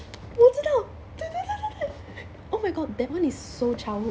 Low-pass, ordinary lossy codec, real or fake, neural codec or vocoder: none; none; real; none